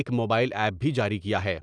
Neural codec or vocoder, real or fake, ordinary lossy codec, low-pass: none; real; none; 9.9 kHz